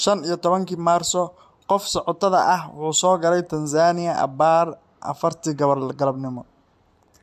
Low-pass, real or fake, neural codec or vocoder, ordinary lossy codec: 14.4 kHz; fake; vocoder, 44.1 kHz, 128 mel bands every 256 samples, BigVGAN v2; MP3, 64 kbps